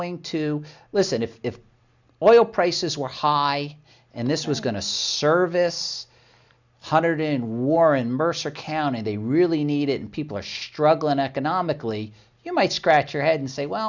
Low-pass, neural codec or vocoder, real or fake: 7.2 kHz; none; real